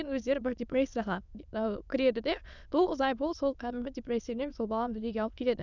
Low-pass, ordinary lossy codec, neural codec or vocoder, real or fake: 7.2 kHz; none; autoencoder, 22.05 kHz, a latent of 192 numbers a frame, VITS, trained on many speakers; fake